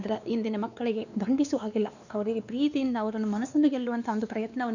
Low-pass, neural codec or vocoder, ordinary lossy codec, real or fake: 7.2 kHz; codec, 16 kHz, 2 kbps, X-Codec, WavLM features, trained on Multilingual LibriSpeech; none; fake